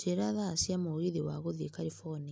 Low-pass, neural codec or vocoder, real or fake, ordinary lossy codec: none; none; real; none